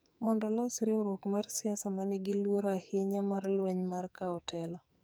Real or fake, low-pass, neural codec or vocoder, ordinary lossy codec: fake; none; codec, 44.1 kHz, 2.6 kbps, SNAC; none